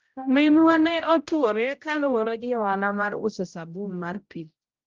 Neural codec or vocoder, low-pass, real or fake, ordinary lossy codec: codec, 16 kHz, 0.5 kbps, X-Codec, HuBERT features, trained on general audio; 7.2 kHz; fake; Opus, 32 kbps